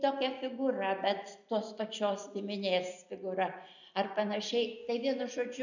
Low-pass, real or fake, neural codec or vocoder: 7.2 kHz; real; none